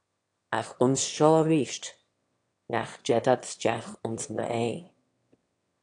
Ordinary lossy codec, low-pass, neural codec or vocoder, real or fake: AAC, 48 kbps; 9.9 kHz; autoencoder, 22.05 kHz, a latent of 192 numbers a frame, VITS, trained on one speaker; fake